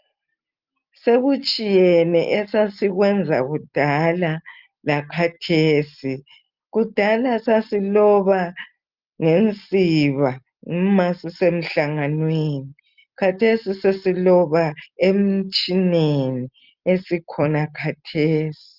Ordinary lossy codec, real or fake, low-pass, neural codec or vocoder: Opus, 24 kbps; real; 5.4 kHz; none